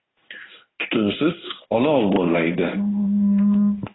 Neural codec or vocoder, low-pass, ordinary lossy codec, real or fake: codec, 16 kHz in and 24 kHz out, 1 kbps, XY-Tokenizer; 7.2 kHz; AAC, 16 kbps; fake